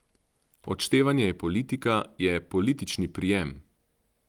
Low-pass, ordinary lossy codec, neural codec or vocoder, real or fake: 19.8 kHz; Opus, 24 kbps; none; real